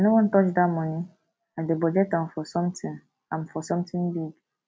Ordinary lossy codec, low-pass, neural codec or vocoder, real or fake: none; none; none; real